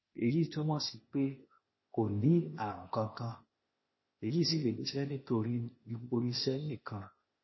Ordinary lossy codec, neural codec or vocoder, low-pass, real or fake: MP3, 24 kbps; codec, 16 kHz, 0.8 kbps, ZipCodec; 7.2 kHz; fake